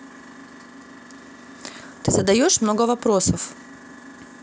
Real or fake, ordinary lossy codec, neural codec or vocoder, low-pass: real; none; none; none